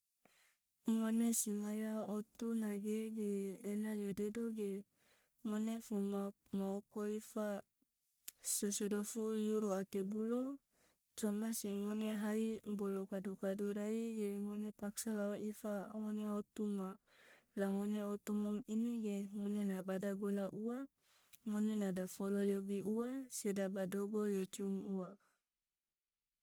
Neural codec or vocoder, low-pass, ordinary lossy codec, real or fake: codec, 44.1 kHz, 1.7 kbps, Pupu-Codec; none; none; fake